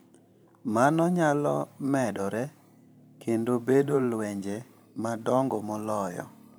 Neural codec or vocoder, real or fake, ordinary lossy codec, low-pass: vocoder, 44.1 kHz, 128 mel bands every 512 samples, BigVGAN v2; fake; none; none